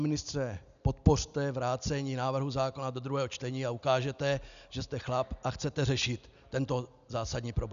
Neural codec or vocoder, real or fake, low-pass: none; real; 7.2 kHz